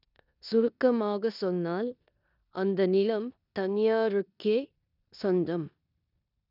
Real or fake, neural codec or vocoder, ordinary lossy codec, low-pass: fake; codec, 16 kHz in and 24 kHz out, 0.9 kbps, LongCat-Audio-Codec, four codebook decoder; none; 5.4 kHz